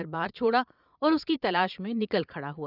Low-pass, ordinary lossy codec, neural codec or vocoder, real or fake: 5.4 kHz; none; vocoder, 22.05 kHz, 80 mel bands, Vocos; fake